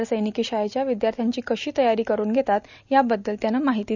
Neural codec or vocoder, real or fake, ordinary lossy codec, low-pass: none; real; none; 7.2 kHz